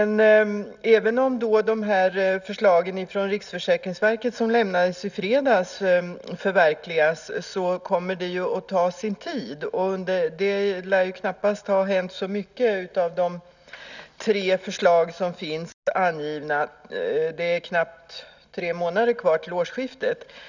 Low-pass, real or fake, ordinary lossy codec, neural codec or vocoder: 7.2 kHz; real; none; none